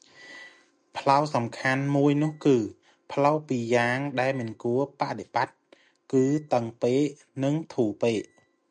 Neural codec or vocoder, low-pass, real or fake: none; 9.9 kHz; real